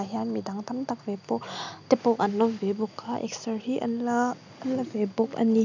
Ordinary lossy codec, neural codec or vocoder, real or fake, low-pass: none; none; real; 7.2 kHz